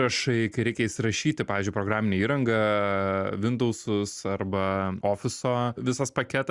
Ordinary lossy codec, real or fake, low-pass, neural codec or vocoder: Opus, 64 kbps; real; 10.8 kHz; none